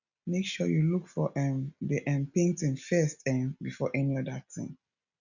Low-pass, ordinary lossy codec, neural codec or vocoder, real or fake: 7.2 kHz; none; none; real